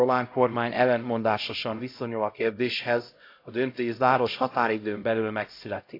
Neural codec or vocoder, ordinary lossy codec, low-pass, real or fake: codec, 16 kHz, 0.5 kbps, X-Codec, HuBERT features, trained on LibriSpeech; AAC, 32 kbps; 5.4 kHz; fake